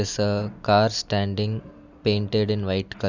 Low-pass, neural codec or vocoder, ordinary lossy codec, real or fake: 7.2 kHz; none; none; real